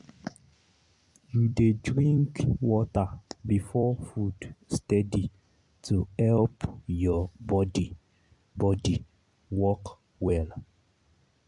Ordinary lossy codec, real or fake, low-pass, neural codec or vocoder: MP3, 64 kbps; fake; 10.8 kHz; vocoder, 44.1 kHz, 128 mel bands every 256 samples, BigVGAN v2